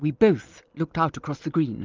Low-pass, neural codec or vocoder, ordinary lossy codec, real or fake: 7.2 kHz; none; Opus, 32 kbps; real